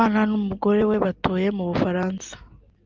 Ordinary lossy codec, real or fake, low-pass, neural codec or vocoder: Opus, 32 kbps; real; 7.2 kHz; none